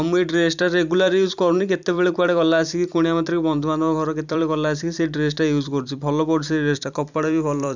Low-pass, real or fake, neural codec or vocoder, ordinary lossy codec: 7.2 kHz; fake; vocoder, 44.1 kHz, 128 mel bands every 256 samples, BigVGAN v2; none